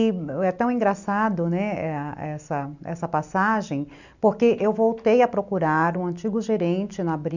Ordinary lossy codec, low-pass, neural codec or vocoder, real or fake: none; 7.2 kHz; none; real